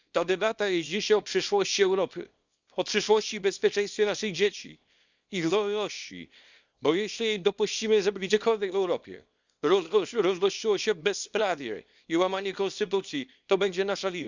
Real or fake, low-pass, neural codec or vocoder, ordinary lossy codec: fake; 7.2 kHz; codec, 24 kHz, 0.9 kbps, WavTokenizer, small release; Opus, 64 kbps